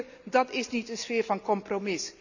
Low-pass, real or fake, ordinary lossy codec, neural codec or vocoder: 7.2 kHz; real; none; none